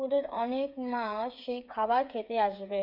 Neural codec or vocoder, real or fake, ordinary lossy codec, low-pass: codec, 16 kHz, 8 kbps, FreqCodec, smaller model; fake; none; 5.4 kHz